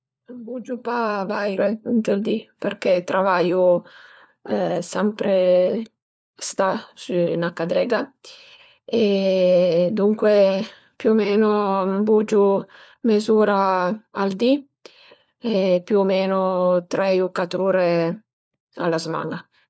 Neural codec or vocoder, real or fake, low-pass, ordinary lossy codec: codec, 16 kHz, 4 kbps, FunCodec, trained on LibriTTS, 50 frames a second; fake; none; none